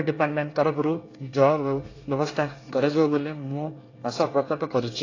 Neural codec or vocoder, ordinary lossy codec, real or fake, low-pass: codec, 24 kHz, 1 kbps, SNAC; AAC, 32 kbps; fake; 7.2 kHz